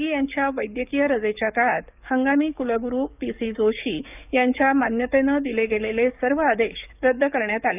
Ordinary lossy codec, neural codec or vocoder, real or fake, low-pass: none; codec, 44.1 kHz, 7.8 kbps, DAC; fake; 3.6 kHz